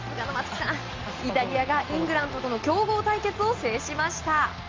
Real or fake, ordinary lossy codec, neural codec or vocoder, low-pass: real; Opus, 32 kbps; none; 7.2 kHz